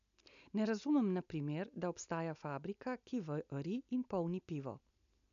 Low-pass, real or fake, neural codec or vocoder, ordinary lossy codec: 7.2 kHz; real; none; none